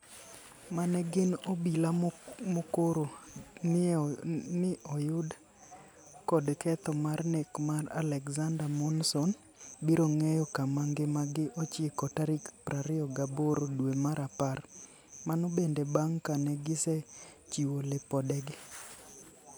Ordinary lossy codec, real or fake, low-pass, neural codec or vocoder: none; real; none; none